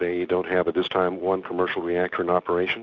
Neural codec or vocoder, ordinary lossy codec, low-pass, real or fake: none; Opus, 64 kbps; 7.2 kHz; real